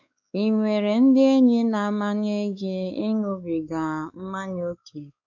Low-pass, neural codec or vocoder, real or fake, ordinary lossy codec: 7.2 kHz; codec, 16 kHz, 4 kbps, X-Codec, WavLM features, trained on Multilingual LibriSpeech; fake; none